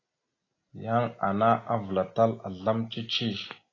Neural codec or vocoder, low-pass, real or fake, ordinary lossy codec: none; 7.2 kHz; real; AAC, 32 kbps